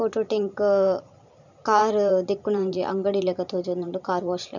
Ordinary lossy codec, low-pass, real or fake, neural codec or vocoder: none; 7.2 kHz; fake; vocoder, 44.1 kHz, 128 mel bands every 512 samples, BigVGAN v2